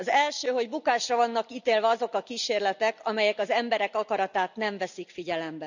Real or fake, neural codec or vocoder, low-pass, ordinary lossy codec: real; none; 7.2 kHz; none